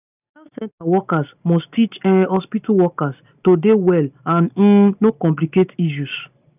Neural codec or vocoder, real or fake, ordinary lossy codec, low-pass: none; real; none; 3.6 kHz